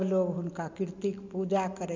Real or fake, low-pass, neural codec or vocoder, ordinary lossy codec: real; 7.2 kHz; none; MP3, 64 kbps